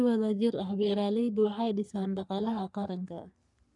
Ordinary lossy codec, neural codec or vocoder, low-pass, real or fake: none; codec, 44.1 kHz, 3.4 kbps, Pupu-Codec; 10.8 kHz; fake